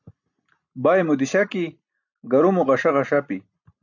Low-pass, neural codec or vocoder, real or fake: 7.2 kHz; none; real